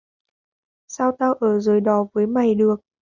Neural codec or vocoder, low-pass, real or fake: none; 7.2 kHz; real